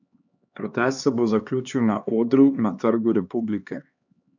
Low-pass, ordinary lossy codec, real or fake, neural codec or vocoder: 7.2 kHz; none; fake; codec, 16 kHz, 2 kbps, X-Codec, HuBERT features, trained on LibriSpeech